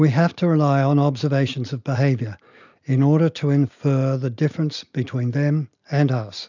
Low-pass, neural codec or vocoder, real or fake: 7.2 kHz; none; real